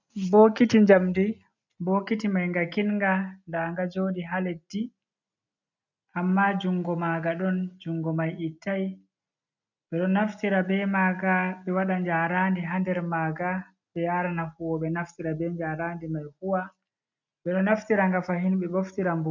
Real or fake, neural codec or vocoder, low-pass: real; none; 7.2 kHz